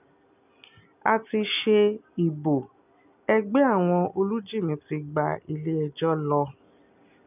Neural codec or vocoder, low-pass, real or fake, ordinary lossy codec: none; 3.6 kHz; real; none